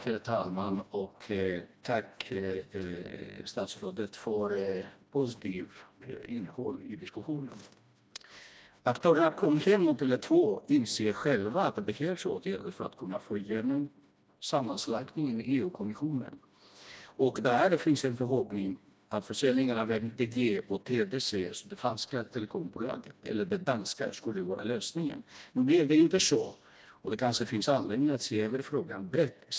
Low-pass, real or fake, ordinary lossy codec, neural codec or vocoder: none; fake; none; codec, 16 kHz, 1 kbps, FreqCodec, smaller model